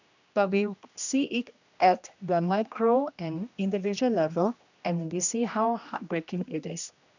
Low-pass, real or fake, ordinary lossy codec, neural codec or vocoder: 7.2 kHz; fake; none; codec, 16 kHz, 1 kbps, X-Codec, HuBERT features, trained on general audio